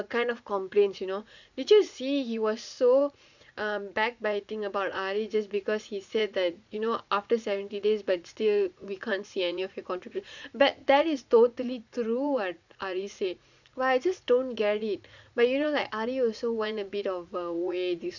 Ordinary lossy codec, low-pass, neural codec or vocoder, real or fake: none; 7.2 kHz; vocoder, 44.1 kHz, 80 mel bands, Vocos; fake